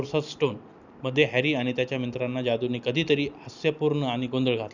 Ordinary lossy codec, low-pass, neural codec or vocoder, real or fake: none; 7.2 kHz; none; real